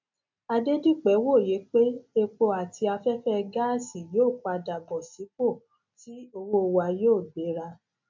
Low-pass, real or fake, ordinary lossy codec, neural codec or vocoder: 7.2 kHz; real; MP3, 64 kbps; none